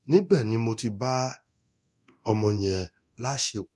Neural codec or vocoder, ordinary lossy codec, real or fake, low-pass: codec, 24 kHz, 0.9 kbps, DualCodec; none; fake; none